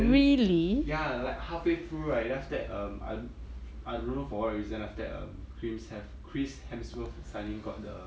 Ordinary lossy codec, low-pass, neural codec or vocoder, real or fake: none; none; none; real